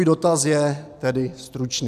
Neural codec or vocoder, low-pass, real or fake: none; 14.4 kHz; real